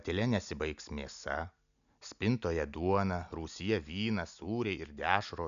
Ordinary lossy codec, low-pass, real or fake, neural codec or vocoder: MP3, 96 kbps; 7.2 kHz; real; none